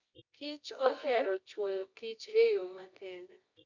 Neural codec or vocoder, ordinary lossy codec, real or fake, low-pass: codec, 24 kHz, 0.9 kbps, WavTokenizer, medium music audio release; Opus, 64 kbps; fake; 7.2 kHz